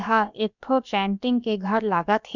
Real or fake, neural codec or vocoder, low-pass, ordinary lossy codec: fake; codec, 16 kHz, about 1 kbps, DyCAST, with the encoder's durations; 7.2 kHz; none